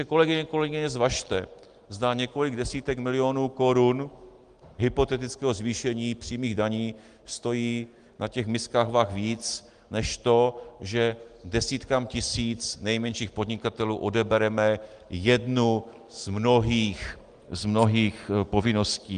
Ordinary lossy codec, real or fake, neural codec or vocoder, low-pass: Opus, 24 kbps; real; none; 9.9 kHz